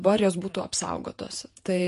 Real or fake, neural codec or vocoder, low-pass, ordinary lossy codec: fake; vocoder, 48 kHz, 128 mel bands, Vocos; 14.4 kHz; MP3, 48 kbps